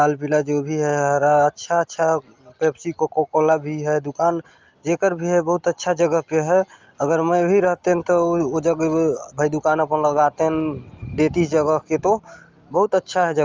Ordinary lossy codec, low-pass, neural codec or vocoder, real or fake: Opus, 24 kbps; 7.2 kHz; none; real